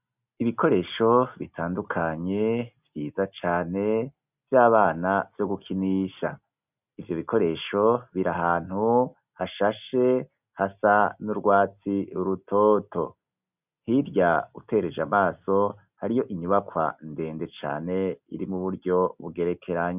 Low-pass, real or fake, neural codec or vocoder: 3.6 kHz; real; none